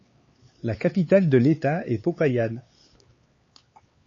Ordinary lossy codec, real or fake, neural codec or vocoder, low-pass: MP3, 32 kbps; fake; codec, 16 kHz, 4 kbps, X-Codec, HuBERT features, trained on LibriSpeech; 7.2 kHz